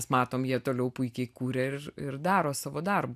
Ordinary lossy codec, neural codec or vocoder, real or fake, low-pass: AAC, 96 kbps; none; real; 14.4 kHz